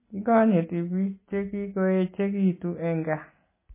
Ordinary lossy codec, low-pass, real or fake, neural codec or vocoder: MP3, 16 kbps; 3.6 kHz; real; none